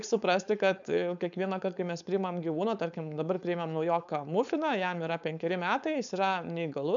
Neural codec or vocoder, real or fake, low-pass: codec, 16 kHz, 4.8 kbps, FACodec; fake; 7.2 kHz